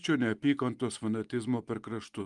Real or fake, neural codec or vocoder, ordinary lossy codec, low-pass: real; none; Opus, 32 kbps; 10.8 kHz